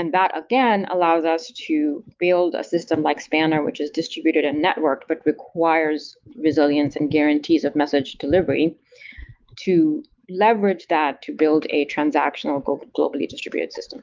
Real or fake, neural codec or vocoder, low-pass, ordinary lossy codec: fake; autoencoder, 48 kHz, 128 numbers a frame, DAC-VAE, trained on Japanese speech; 7.2 kHz; Opus, 32 kbps